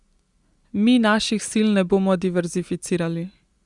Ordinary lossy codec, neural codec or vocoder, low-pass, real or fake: Opus, 64 kbps; none; 10.8 kHz; real